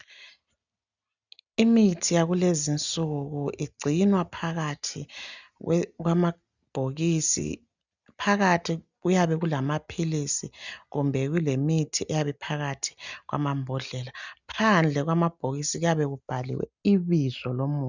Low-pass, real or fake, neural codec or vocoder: 7.2 kHz; real; none